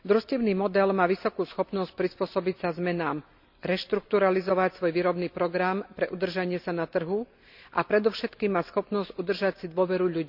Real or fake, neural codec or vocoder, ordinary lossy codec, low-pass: real; none; none; 5.4 kHz